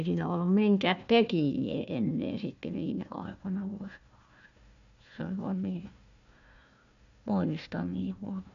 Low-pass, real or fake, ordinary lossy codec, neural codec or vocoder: 7.2 kHz; fake; AAC, 96 kbps; codec, 16 kHz, 1 kbps, FunCodec, trained on Chinese and English, 50 frames a second